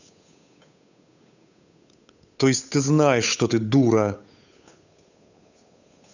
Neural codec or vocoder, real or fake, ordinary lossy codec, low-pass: codec, 16 kHz, 8 kbps, FunCodec, trained on Chinese and English, 25 frames a second; fake; none; 7.2 kHz